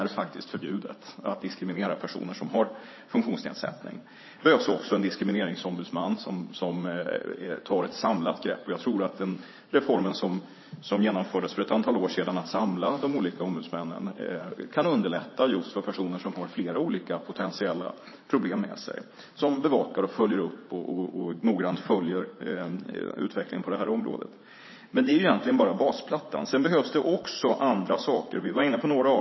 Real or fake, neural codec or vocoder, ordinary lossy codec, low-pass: fake; vocoder, 44.1 kHz, 80 mel bands, Vocos; MP3, 24 kbps; 7.2 kHz